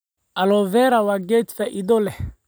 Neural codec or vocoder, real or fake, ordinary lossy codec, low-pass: none; real; none; none